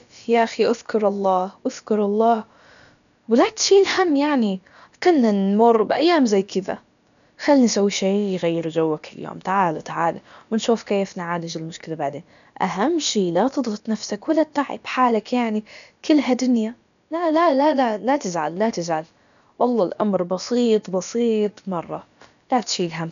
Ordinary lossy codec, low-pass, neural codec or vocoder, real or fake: none; 7.2 kHz; codec, 16 kHz, about 1 kbps, DyCAST, with the encoder's durations; fake